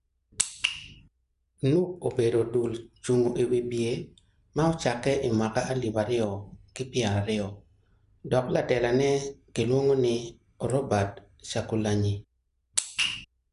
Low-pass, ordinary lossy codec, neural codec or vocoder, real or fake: 10.8 kHz; none; none; real